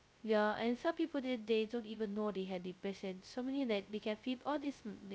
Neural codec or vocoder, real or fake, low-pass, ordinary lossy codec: codec, 16 kHz, 0.2 kbps, FocalCodec; fake; none; none